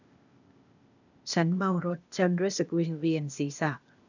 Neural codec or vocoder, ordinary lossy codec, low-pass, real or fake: codec, 16 kHz, 0.8 kbps, ZipCodec; none; 7.2 kHz; fake